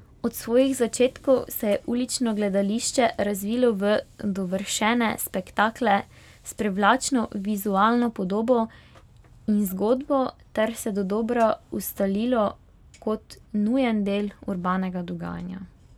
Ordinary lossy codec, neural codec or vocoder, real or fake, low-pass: none; none; real; 19.8 kHz